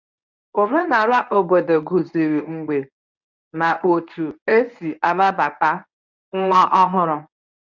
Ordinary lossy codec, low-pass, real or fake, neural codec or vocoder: none; 7.2 kHz; fake; codec, 24 kHz, 0.9 kbps, WavTokenizer, medium speech release version 1